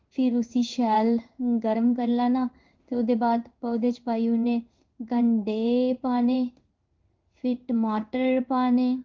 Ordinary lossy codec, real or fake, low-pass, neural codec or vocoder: Opus, 32 kbps; fake; 7.2 kHz; codec, 16 kHz in and 24 kHz out, 1 kbps, XY-Tokenizer